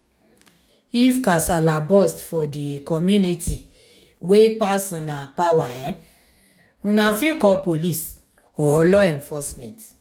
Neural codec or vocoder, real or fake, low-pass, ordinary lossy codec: codec, 44.1 kHz, 2.6 kbps, DAC; fake; 19.8 kHz; none